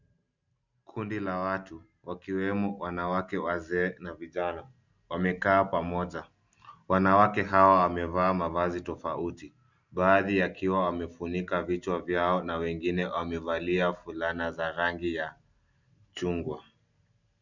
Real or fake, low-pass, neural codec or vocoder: real; 7.2 kHz; none